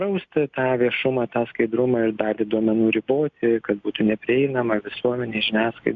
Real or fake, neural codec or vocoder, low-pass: real; none; 7.2 kHz